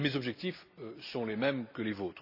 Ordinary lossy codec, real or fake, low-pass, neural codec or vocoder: none; real; 5.4 kHz; none